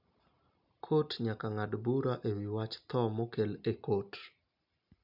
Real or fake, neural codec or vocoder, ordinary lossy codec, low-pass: real; none; none; 5.4 kHz